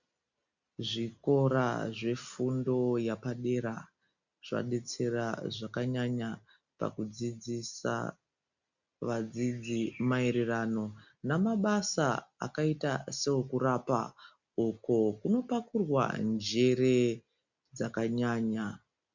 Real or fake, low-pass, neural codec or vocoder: real; 7.2 kHz; none